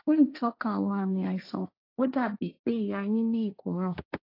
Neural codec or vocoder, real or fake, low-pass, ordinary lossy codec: codec, 16 kHz, 1.1 kbps, Voila-Tokenizer; fake; 5.4 kHz; AAC, 32 kbps